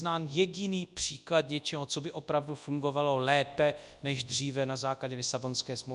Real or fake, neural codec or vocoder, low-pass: fake; codec, 24 kHz, 0.9 kbps, WavTokenizer, large speech release; 10.8 kHz